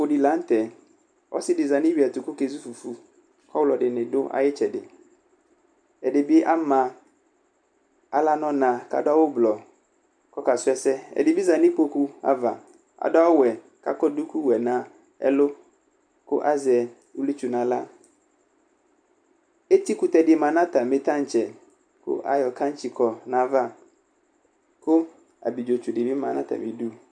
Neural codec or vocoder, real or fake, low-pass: none; real; 9.9 kHz